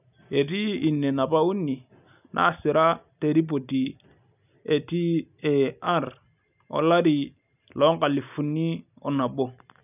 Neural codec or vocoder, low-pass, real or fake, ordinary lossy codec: none; 3.6 kHz; real; none